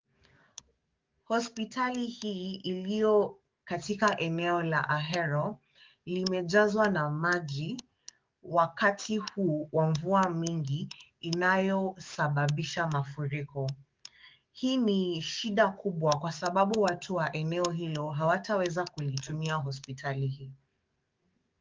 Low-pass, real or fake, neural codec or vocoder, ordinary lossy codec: 7.2 kHz; fake; codec, 44.1 kHz, 7.8 kbps, DAC; Opus, 32 kbps